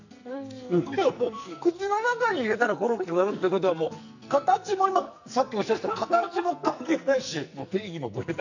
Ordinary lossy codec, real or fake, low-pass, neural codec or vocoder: none; fake; 7.2 kHz; codec, 44.1 kHz, 2.6 kbps, SNAC